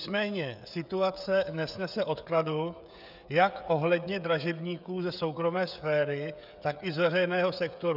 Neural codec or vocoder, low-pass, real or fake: codec, 16 kHz, 16 kbps, FreqCodec, smaller model; 5.4 kHz; fake